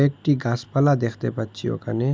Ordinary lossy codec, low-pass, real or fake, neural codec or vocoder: none; none; real; none